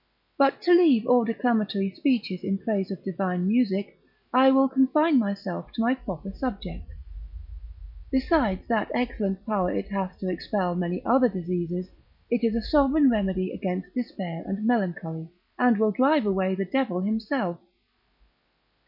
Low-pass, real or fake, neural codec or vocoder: 5.4 kHz; real; none